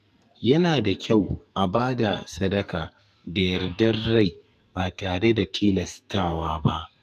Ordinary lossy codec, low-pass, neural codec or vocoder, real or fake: AAC, 96 kbps; 14.4 kHz; codec, 44.1 kHz, 2.6 kbps, SNAC; fake